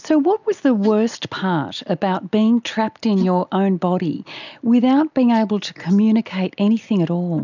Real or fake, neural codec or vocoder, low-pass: real; none; 7.2 kHz